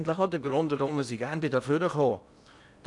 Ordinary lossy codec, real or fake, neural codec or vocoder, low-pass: none; fake; codec, 16 kHz in and 24 kHz out, 0.8 kbps, FocalCodec, streaming, 65536 codes; 10.8 kHz